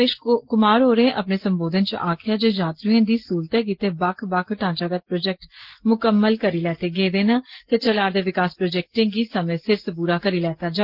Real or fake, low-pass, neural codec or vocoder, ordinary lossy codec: real; 5.4 kHz; none; Opus, 16 kbps